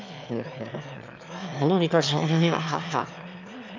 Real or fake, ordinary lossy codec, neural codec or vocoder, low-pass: fake; MP3, 64 kbps; autoencoder, 22.05 kHz, a latent of 192 numbers a frame, VITS, trained on one speaker; 7.2 kHz